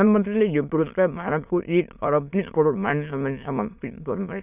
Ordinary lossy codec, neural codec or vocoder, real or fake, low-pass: none; autoencoder, 22.05 kHz, a latent of 192 numbers a frame, VITS, trained on many speakers; fake; 3.6 kHz